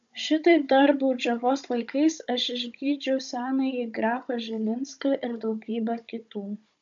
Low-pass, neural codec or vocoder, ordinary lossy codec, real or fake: 7.2 kHz; codec, 16 kHz, 16 kbps, FunCodec, trained on Chinese and English, 50 frames a second; AAC, 64 kbps; fake